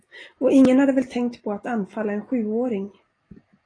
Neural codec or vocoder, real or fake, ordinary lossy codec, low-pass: none; real; AAC, 32 kbps; 9.9 kHz